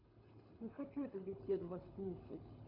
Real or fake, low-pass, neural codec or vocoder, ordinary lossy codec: fake; 5.4 kHz; codec, 24 kHz, 6 kbps, HILCodec; none